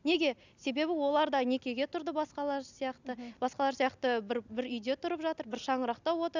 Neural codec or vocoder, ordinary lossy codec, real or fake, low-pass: none; none; real; 7.2 kHz